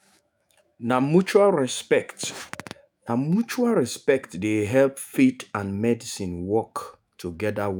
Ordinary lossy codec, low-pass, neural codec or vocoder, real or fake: none; none; autoencoder, 48 kHz, 128 numbers a frame, DAC-VAE, trained on Japanese speech; fake